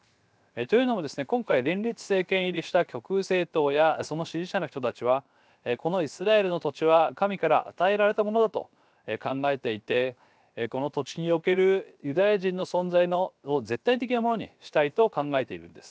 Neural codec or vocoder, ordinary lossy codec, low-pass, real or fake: codec, 16 kHz, 0.7 kbps, FocalCodec; none; none; fake